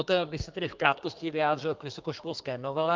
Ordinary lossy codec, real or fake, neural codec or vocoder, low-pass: Opus, 32 kbps; fake; codec, 32 kHz, 1.9 kbps, SNAC; 7.2 kHz